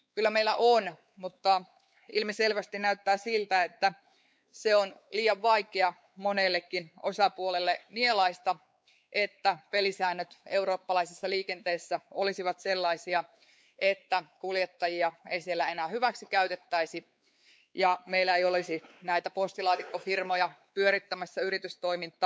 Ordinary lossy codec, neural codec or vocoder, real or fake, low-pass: none; codec, 16 kHz, 4 kbps, X-Codec, WavLM features, trained on Multilingual LibriSpeech; fake; none